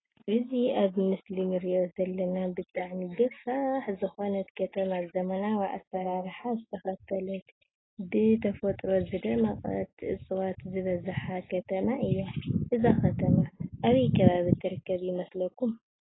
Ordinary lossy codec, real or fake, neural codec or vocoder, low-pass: AAC, 16 kbps; real; none; 7.2 kHz